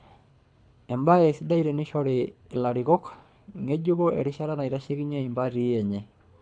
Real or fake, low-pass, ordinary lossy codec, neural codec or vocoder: fake; 9.9 kHz; none; codec, 24 kHz, 6 kbps, HILCodec